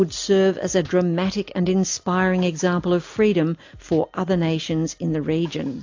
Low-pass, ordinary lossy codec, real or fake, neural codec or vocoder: 7.2 kHz; AAC, 48 kbps; real; none